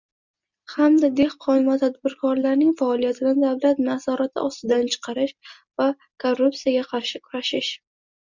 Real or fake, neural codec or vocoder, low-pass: real; none; 7.2 kHz